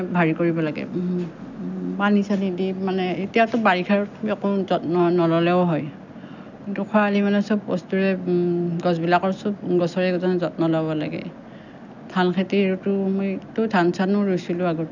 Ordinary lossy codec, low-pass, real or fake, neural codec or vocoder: none; 7.2 kHz; real; none